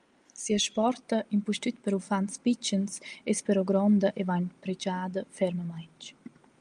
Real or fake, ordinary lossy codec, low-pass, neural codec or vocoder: real; Opus, 32 kbps; 9.9 kHz; none